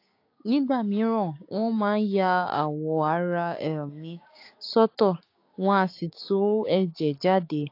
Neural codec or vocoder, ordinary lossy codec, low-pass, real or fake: codec, 16 kHz, 6 kbps, DAC; AAC, 32 kbps; 5.4 kHz; fake